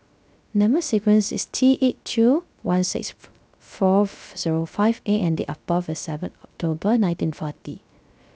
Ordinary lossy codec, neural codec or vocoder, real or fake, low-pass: none; codec, 16 kHz, 0.3 kbps, FocalCodec; fake; none